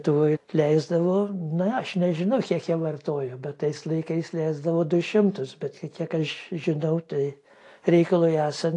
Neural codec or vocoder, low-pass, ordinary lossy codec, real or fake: none; 10.8 kHz; AAC, 48 kbps; real